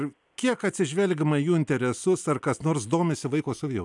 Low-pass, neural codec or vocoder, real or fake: 10.8 kHz; none; real